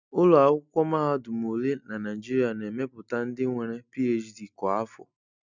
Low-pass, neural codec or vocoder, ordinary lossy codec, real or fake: 7.2 kHz; none; none; real